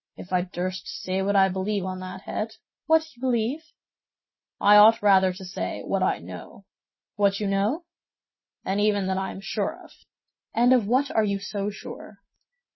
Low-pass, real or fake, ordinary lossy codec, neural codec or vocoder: 7.2 kHz; real; MP3, 24 kbps; none